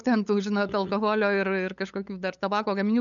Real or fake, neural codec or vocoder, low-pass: fake; codec, 16 kHz, 8 kbps, FunCodec, trained on LibriTTS, 25 frames a second; 7.2 kHz